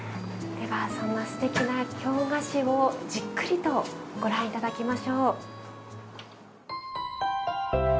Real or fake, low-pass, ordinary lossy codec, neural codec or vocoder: real; none; none; none